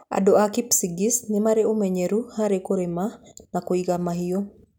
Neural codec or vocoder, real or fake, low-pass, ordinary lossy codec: none; real; 19.8 kHz; none